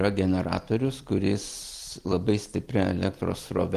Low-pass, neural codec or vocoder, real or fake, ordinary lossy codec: 14.4 kHz; vocoder, 44.1 kHz, 128 mel bands every 256 samples, BigVGAN v2; fake; Opus, 24 kbps